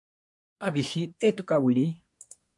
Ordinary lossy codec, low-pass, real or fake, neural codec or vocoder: MP3, 64 kbps; 10.8 kHz; fake; codec, 24 kHz, 1 kbps, SNAC